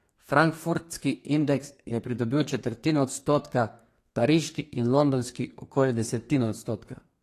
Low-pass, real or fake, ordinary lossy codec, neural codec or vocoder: 14.4 kHz; fake; AAC, 48 kbps; codec, 32 kHz, 1.9 kbps, SNAC